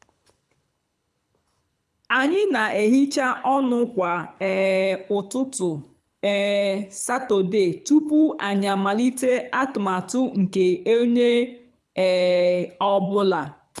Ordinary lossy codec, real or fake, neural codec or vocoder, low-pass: none; fake; codec, 24 kHz, 6 kbps, HILCodec; none